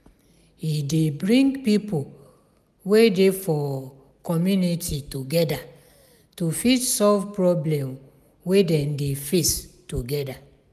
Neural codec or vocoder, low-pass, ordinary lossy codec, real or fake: none; 14.4 kHz; none; real